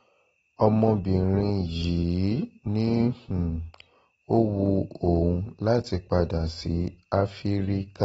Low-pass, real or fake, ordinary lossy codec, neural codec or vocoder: 19.8 kHz; real; AAC, 24 kbps; none